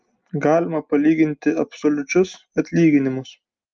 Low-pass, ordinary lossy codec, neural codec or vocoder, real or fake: 7.2 kHz; Opus, 24 kbps; none; real